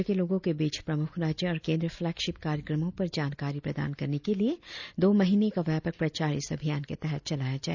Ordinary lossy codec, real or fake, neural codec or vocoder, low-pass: none; real; none; 7.2 kHz